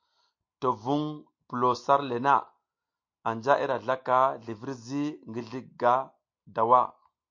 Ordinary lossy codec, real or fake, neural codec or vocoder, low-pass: MP3, 48 kbps; real; none; 7.2 kHz